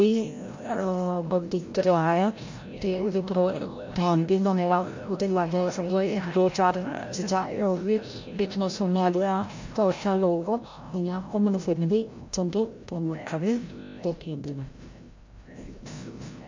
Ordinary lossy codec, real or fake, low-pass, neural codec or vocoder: MP3, 48 kbps; fake; 7.2 kHz; codec, 16 kHz, 0.5 kbps, FreqCodec, larger model